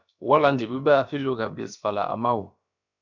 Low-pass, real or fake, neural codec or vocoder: 7.2 kHz; fake; codec, 16 kHz, about 1 kbps, DyCAST, with the encoder's durations